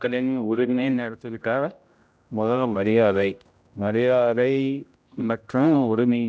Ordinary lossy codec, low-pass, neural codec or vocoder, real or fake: none; none; codec, 16 kHz, 0.5 kbps, X-Codec, HuBERT features, trained on general audio; fake